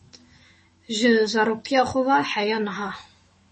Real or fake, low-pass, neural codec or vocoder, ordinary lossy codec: fake; 10.8 kHz; autoencoder, 48 kHz, 128 numbers a frame, DAC-VAE, trained on Japanese speech; MP3, 32 kbps